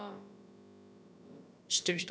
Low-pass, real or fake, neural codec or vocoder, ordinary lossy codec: none; fake; codec, 16 kHz, about 1 kbps, DyCAST, with the encoder's durations; none